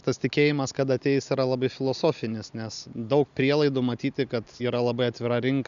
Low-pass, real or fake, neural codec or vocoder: 7.2 kHz; real; none